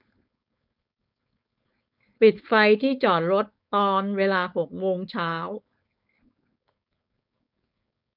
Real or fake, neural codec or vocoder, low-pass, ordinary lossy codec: fake; codec, 16 kHz, 4.8 kbps, FACodec; 5.4 kHz; none